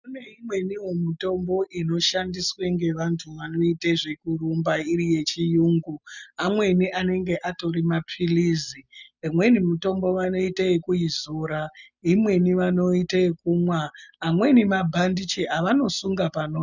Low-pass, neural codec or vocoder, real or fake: 7.2 kHz; none; real